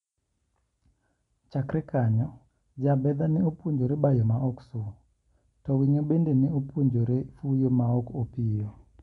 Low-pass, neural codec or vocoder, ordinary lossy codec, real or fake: 10.8 kHz; none; none; real